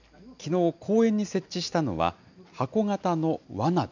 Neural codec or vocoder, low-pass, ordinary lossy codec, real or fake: none; 7.2 kHz; none; real